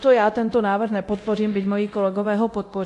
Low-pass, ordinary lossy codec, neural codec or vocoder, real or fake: 10.8 kHz; AAC, 48 kbps; codec, 24 kHz, 0.9 kbps, DualCodec; fake